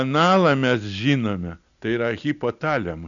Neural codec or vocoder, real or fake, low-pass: none; real; 7.2 kHz